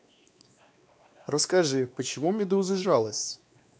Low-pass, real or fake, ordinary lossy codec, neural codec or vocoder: none; fake; none; codec, 16 kHz, 2 kbps, X-Codec, WavLM features, trained on Multilingual LibriSpeech